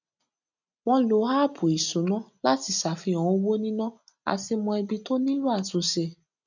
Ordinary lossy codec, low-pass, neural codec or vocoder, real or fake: none; 7.2 kHz; none; real